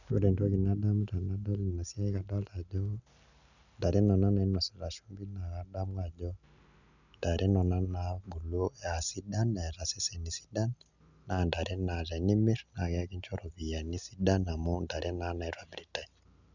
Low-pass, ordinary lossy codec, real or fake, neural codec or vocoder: 7.2 kHz; none; real; none